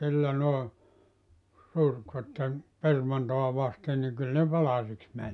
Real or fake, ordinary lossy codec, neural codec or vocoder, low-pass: real; none; none; 10.8 kHz